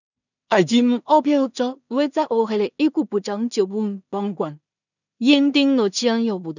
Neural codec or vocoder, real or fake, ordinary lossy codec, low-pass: codec, 16 kHz in and 24 kHz out, 0.4 kbps, LongCat-Audio-Codec, two codebook decoder; fake; none; 7.2 kHz